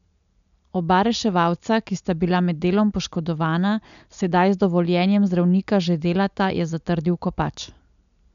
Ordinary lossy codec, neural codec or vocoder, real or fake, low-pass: none; none; real; 7.2 kHz